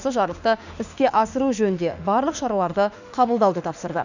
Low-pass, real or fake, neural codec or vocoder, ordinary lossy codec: 7.2 kHz; fake; autoencoder, 48 kHz, 32 numbers a frame, DAC-VAE, trained on Japanese speech; none